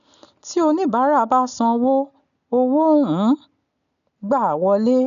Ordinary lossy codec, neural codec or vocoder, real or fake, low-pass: none; none; real; 7.2 kHz